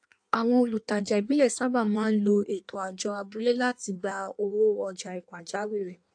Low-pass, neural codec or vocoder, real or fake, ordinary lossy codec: 9.9 kHz; codec, 16 kHz in and 24 kHz out, 1.1 kbps, FireRedTTS-2 codec; fake; AAC, 64 kbps